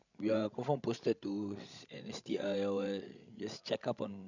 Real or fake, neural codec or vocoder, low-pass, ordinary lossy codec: fake; codec, 16 kHz, 16 kbps, FreqCodec, larger model; 7.2 kHz; none